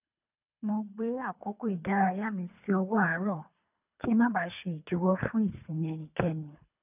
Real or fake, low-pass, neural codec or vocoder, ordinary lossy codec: fake; 3.6 kHz; codec, 24 kHz, 3 kbps, HILCodec; none